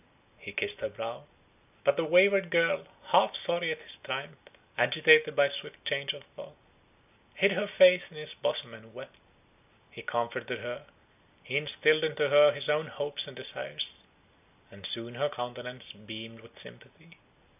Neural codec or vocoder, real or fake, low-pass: none; real; 3.6 kHz